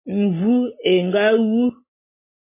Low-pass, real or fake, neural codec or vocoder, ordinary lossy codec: 3.6 kHz; real; none; MP3, 16 kbps